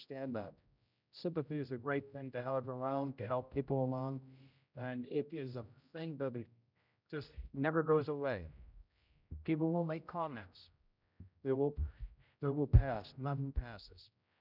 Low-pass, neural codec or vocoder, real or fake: 5.4 kHz; codec, 16 kHz, 0.5 kbps, X-Codec, HuBERT features, trained on general audio; fake